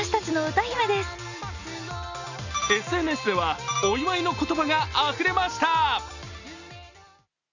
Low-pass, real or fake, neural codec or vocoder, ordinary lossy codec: 7.2 kHz; real; none; none